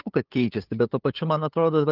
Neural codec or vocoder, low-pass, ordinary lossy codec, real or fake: codec, 16 kHz, 8 kbps, FunCodec, trained on LibriTTS, 25 frames a second; 5.4 kHz; Opus, 24 kbps; fake